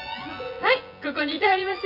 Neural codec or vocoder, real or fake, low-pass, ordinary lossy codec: vocoder, 24 kHz, 100 mel bands, Vocos; fake; 5.4 kHz; none